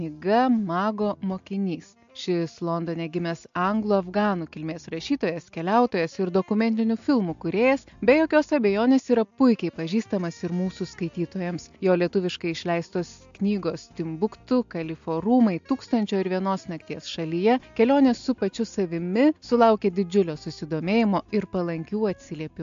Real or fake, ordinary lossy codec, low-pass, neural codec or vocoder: real; AAC, 64 kbps; 7.2 kHz; none